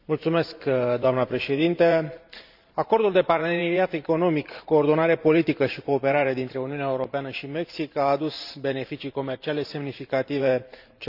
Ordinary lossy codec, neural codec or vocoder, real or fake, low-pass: none; vocoder, 44.1 kHz, 128 mel bands every 512 samples, BigVGAN v2; fake; 5.4 kHz